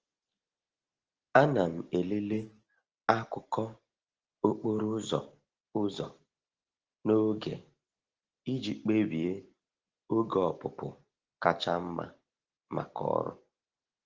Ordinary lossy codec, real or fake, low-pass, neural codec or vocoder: Opus, 16 kbps; real; 7.2 kHz; none